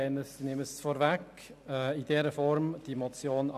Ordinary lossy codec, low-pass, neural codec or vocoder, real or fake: AAC, 96 kbps; 14.4 kHz; none; real